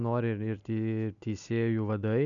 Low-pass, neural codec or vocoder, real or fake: 7.2 kHz; none; real